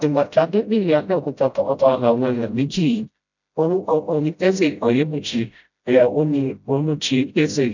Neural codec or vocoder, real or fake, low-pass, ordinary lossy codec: codec, 16 kHz, 0.5 kbps, FreqCodec, smaller model; fake; 7.2 kHz; none